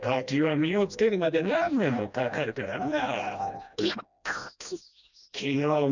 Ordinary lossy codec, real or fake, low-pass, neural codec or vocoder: none; fake; 7.2 kHz; codec, 16 kHz, 1 kbps, FreqCodec, smaller model